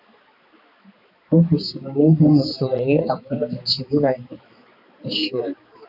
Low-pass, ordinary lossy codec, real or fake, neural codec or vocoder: 5.4 kHz; AAC, 48 kbps; fake; codec, 16 kHz, 4 kbps, X-Codec, HuBERT features, trained on balanced general audio